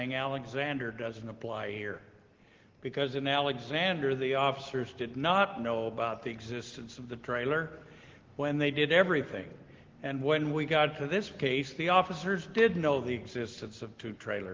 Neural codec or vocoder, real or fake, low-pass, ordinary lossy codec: none; real; 7.2 kHz; Opus, 16 kbps